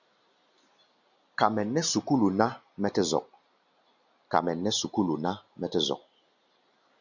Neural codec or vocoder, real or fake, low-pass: none; real; 7.2 kHz